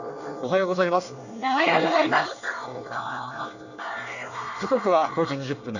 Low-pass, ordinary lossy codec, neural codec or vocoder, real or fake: 7.2 kHz; none; codec, 24 kHz, 1 kbps, SNAC; fake